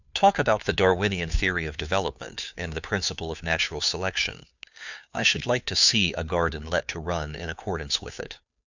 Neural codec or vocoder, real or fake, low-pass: codec, 16 kHz, 2 kbps, FunCodec, trained on Chinese and English, 25 frames a second; fake; 7.2 kHz